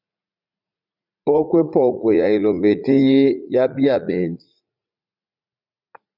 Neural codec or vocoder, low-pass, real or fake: vocoder, 44.1 kHz, 80 mel bands, Vocos; 5.4 kHz; fake